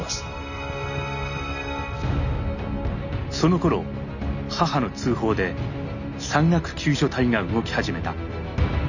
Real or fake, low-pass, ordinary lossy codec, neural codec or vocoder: real; 7.2 kHz; none; none